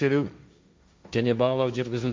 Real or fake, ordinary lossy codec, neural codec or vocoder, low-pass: fake; none; codec, 16 kHz, 1.1 kbps, Voila-Tokenizer; none